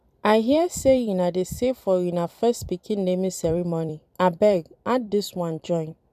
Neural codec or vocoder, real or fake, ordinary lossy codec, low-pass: vocoder, 44.1 kHz, 128 mel bands every 256 samples, BigVGAN v2; fake; none; 14.4 kHz